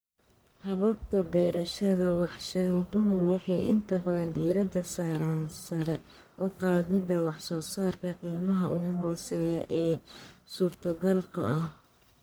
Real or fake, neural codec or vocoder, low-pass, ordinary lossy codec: fake; codec, 44.1 kHz, 1.7 kbps, Pupu-Codec; none; none